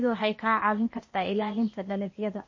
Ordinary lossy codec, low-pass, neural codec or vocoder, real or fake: MP3, 32 kbps; 7.2 kHz; codec, 16 kHz, 0.8 kbps, ZipCodec; fake